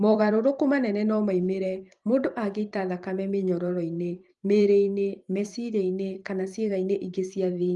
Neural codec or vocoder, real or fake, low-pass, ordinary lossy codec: vocoder, 44.1 kHz, 128 mel bands every 512 samples, BigVGAN v2; fake; 10.8 kHz; Opus, 24 kbps